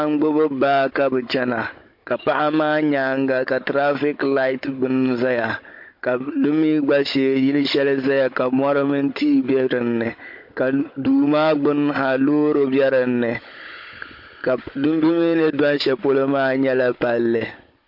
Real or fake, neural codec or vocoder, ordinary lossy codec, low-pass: fake; codec, 16 kHz, 16 kbps, FunCodec, trained on Chinese and English, 50 frames a second; MP3, 32 kbps; 5.4 kHz